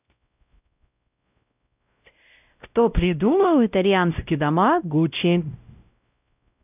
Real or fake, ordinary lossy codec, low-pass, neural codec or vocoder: fake; none; 3.6 kHz; codec, 16 kHz, 0.5 kbps, X-Codec, WavLM features, trained on Multilingual LibriSpeech